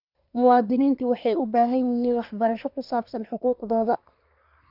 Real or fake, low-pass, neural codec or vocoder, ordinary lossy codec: fake; 5.4 kHz; codec, 24 kHz, 1 kbps, SNAC; none